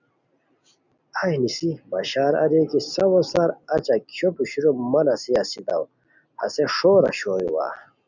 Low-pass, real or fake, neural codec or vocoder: 7.2 kHz; real; none